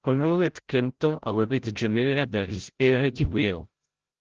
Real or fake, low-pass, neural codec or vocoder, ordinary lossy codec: fake; 7.2 kHz; codec, 16 kHz, 0.5 kbps, FreqCodec, larger model; Opus, 16 kbps